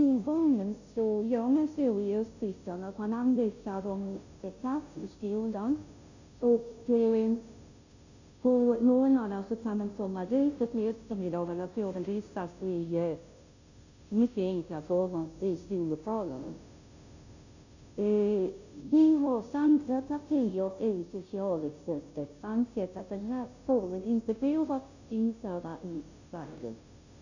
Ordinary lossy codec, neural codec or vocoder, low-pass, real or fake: none; codec, 16 kHz, 0.5 kbps, FunCodec, trained on Chinese and English, 25 frames a second; 7.2 kHz; fake